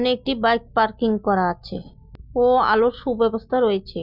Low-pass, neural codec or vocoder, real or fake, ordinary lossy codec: 5.4 kHz; none; real; none